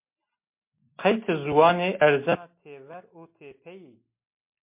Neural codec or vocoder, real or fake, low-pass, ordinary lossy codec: none; real; 3.6 kHz; MP3, 24 kbps